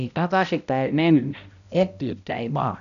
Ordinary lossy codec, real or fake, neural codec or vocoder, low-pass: none; fake; codec, 16 kHz, 0.5 kbps, X-Codec, HuBERT features, trained on balanced general audio; 7.2 kHz